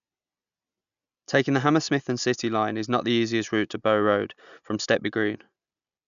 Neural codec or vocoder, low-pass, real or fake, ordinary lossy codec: none; 7.2 kHz; real; none